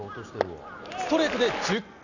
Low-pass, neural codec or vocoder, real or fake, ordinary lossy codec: 7.2 kHz; none; real; none